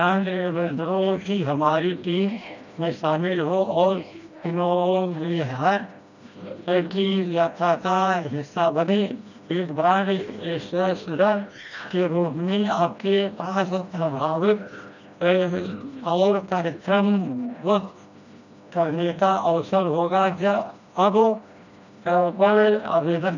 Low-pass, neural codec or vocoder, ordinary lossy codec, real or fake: 7.2 kHz; codec, 16 kHz, 1 kbps, FreqCodec, smaller model; none; fake